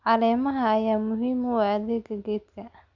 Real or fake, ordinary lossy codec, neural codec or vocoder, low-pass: real; Opus, 64 kbps; none; 7.2 kHz